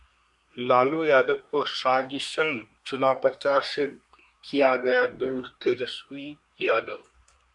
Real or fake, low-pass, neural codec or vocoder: fake; 10.8 kHz; codec, 24 kHz, 1 kbps, SNAC